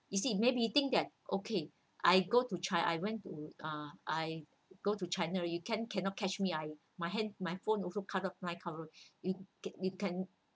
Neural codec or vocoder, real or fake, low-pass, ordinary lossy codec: none; real; none; none